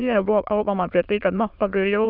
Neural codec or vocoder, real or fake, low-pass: autoencoder, 22.05 kHz, a latent of 192 numbers a frame, VITS, trained on many speakers; fake; 5.4 kHz